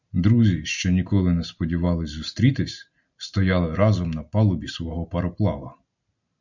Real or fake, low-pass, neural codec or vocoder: real; 7.2 kHz; none